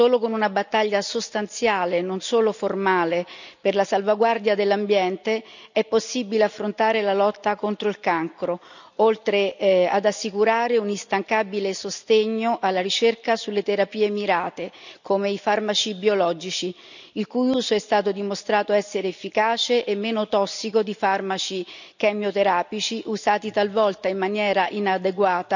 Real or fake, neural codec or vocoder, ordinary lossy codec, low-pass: real; none; none; 7.2 kHz